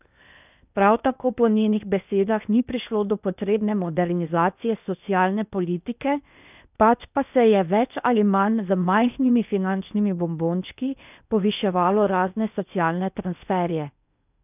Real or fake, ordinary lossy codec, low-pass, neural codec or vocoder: fake; none; 3.6 kHz; codec, 16 kHz in and 24 kHz out, 0.8 kbps, FocalCodec, streaming, 65536 codes